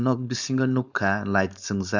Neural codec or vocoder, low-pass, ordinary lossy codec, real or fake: codec, 16 kHz, 4.8 kbps, FACodec; 7.2 kHz; none; fake